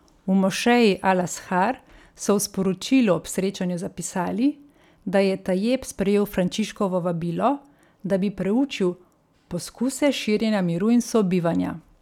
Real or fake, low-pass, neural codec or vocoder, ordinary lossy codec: real; 19.8 kHz; none; none